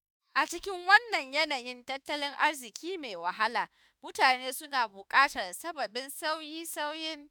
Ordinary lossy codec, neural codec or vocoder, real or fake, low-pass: none; autoencoder, 48 kHz, 32 numbers a frame, DAC-VAE, trained on Japanese speech; fake; none